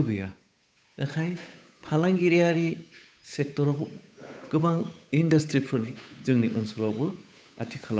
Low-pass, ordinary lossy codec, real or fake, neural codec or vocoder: none; none; fake; codec, 16 kHz, 8 kbps, FunCodec, trained on Chinese and English, 25 frames a second